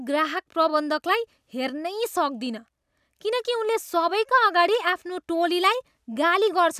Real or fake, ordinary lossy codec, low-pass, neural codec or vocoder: real; none; 14.4 kHz; none